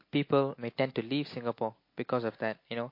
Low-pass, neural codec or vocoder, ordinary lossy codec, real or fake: 5.4 kHz; none; MP3, 32 kbps; real